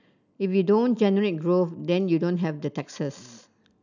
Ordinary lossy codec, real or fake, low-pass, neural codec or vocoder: none; real; 7.2 kHz; none